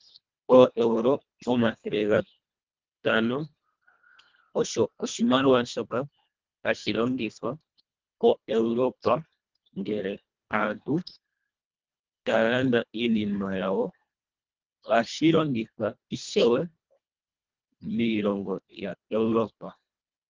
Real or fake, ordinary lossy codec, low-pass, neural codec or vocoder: fake; Opus, 24 kbps; 7.2 kHz; codec, 24 kHz, 1.5 kbps, HILCodec